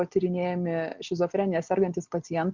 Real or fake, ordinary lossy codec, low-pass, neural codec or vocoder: real; Opus, 64 kbps; 7.2 kHz; none